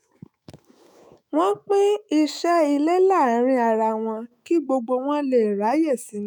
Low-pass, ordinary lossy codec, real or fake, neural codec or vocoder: none; none; fake; autoencoder, 48 kHz, 128 numbers a frame, DAC-VAE, trained on Japanese speech